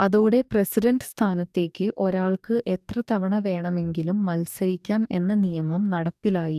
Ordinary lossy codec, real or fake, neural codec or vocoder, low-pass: none; fake; codec, 44.1 kHz, 2.6 kbps, DAC; 14.4 kHz